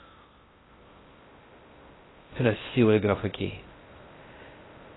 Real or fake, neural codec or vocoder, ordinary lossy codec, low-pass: fake; codec, 16 kHz in and 24 kHz out, 0.6 kbps, FocalCodec, streaming, 2048 codes; AAC, 16 kbps; 7.2 kHz